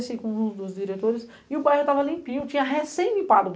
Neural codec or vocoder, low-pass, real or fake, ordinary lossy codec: none; none; real; none